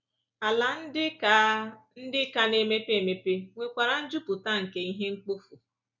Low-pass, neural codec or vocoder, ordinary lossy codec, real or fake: 7.2 kHz; none; none; real